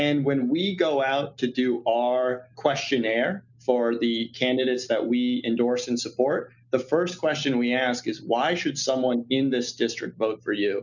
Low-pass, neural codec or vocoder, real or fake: 7.2 kHz; none; real